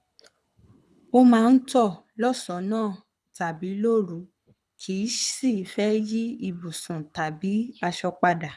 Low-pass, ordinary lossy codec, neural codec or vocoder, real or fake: none; none; codec, 24 kHz, 6 kbps, HILCodec; fake